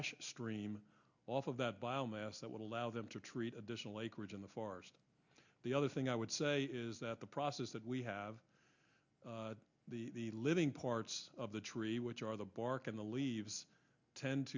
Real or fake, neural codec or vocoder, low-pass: real; none; 7.2 kHz